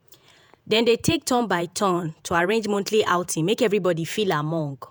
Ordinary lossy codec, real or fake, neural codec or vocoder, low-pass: none; fake; vocoder, 48 kHz, 128 mel bands, Vocos; none